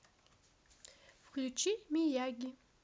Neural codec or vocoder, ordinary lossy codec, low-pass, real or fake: none; none; none; real